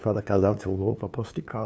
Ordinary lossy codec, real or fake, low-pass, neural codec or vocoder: none; fake; none; codec, 16 kHz, 2 kbps, FunCodec, trained on LibriTTS, 25 frames a second